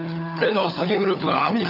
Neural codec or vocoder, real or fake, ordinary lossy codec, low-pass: codec, 16 kHz, 16 kbps, FunCodec, trained on LibriTTS, 50 frames a second; fake; none; 5.4 kHz